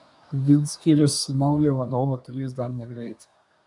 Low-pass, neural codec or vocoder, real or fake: 10.8 kHz; codec, 24 kHz, 1 kbps, SNAC; fake